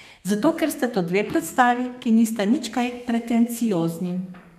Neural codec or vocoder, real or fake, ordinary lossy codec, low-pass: codec, 32 kHz, 1.9 kbps, SNAC; fake; none; 14.4 kHz